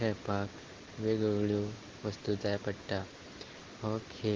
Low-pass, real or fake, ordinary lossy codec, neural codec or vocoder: 7.2 kHz; real; Opus, 16 kbps; none